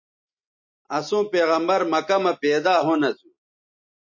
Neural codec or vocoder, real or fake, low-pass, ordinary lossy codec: none; real; 7.2 kHz; MP3, 32 kbps